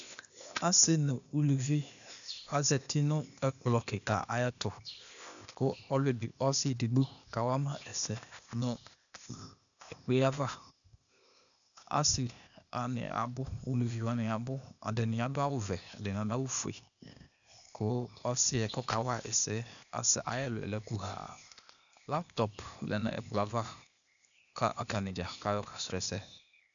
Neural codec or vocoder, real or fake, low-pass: codec, 16 kHz, 0.8 kbps, ZipCodec; fake; 7.2 kHz